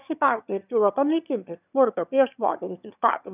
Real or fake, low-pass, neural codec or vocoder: fake; 3.6 kHz; autoencoder, 22.05 kHz, a latent of 192 numbers a frame, VITS, trained on one speaker